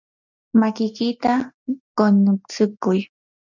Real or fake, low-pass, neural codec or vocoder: real; 7.2 kHz; none